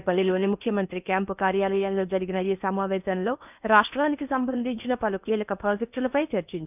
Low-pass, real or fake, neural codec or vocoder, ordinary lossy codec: 3.6 kHz; fake; codec, 16 kHz in and 24 kHz out, 0.8 kbps, FocalCodec, streaming, 65536 codes; none